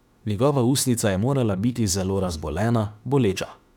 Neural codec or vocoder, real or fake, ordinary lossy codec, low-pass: autoencoder, 48 kHz, 32 numbers a frame, DAC-VAE, trained on Japanese speech; fake; none; 19.8 kHz